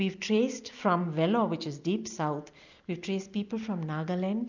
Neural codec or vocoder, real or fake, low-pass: none; real; 7.2 kHz